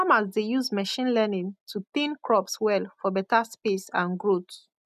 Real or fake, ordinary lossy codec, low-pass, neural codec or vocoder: real; none; 14.4 kHz; none